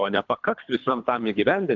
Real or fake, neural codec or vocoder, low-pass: fake; codec, 24 kHz, 3 kbps, HILCodec; 7.2 kHz